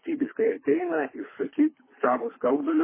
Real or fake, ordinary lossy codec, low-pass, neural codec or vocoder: fake; MP3, 16 kbps; 3.6 kHz; codec, 24 kHz, 3 kbps, HILCodec